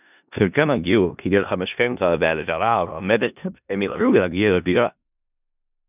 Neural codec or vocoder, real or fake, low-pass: codec, 16 kHz in and 24 kHz out, 0.4 kbps, LongCat-Audio-Codec, four codebook decoder; fake; 3.6 kHz